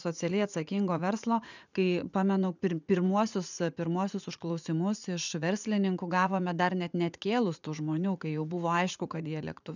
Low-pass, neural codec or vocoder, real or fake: 7.2 kHz; vocoder, 44.1 kHz, 80 mel bands, Vocos; fake